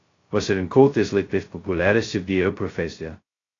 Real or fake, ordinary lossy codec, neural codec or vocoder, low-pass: fake; AAC, 32 kbps; codec, 16 kHz, 0.2 kbps, FocalCodec; 7.2 kHz